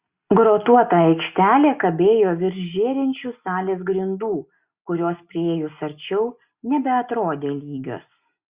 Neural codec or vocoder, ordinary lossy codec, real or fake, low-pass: none; Opus, 64 kbps; real; 3.6 kHz